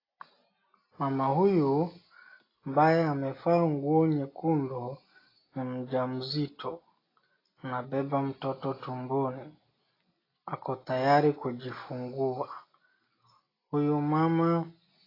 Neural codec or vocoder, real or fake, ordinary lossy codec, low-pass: none; real; AAC, 24 kbps; 5.4 kHz